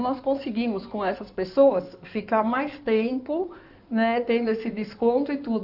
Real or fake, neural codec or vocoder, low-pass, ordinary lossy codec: fake; codec, 16 kHz in and 24 kHz out, 2.2 kbps, FireRedTTS-2 codec; 5.4 kHz; none